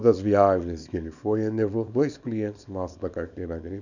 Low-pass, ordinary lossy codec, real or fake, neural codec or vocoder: 7.2 kHz; none; fake; codec, 24 kHz, 0.9 kbps, WavTokenizer, small release